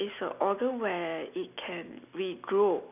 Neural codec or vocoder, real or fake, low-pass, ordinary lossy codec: none; real; 3.6 kHz; none